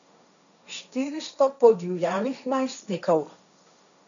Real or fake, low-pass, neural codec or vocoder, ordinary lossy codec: fake; 7.2 kHz; codec, 16 kHz, 1.1 kbps, Voila-Tokenizer; none